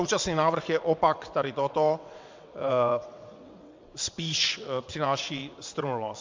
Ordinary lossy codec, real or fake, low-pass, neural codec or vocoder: MP3, 64 kbps; fake; 7.2 kHz; vocoder, 22.05 kHz, 80 mel bands, WaveNeXt